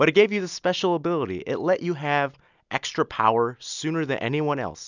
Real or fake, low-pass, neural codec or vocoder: real; 7.2 kHz; none